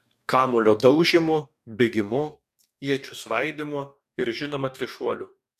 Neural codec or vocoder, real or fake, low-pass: codec, 44.1 kHz, 2.6 kbps, DAC; fake; 14.4 kHz